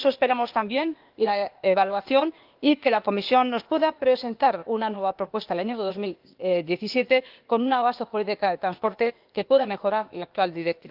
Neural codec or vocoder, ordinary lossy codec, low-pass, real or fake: codec, 16 kHz, 0.8 kbps, ZipCodec; Opus, 32 kbps; 5.4 kHz; fake